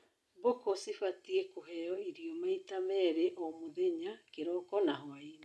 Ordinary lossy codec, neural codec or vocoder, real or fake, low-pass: none; none; real; none